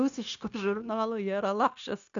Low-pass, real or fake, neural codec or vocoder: 7.2 kHz; fake; codec, 16 kHz, 0.9 kbps, LongCat-Audio-Codec